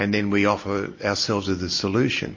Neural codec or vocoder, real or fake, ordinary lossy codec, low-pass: none; real; MP3, 32 kbps; 7.2 kHz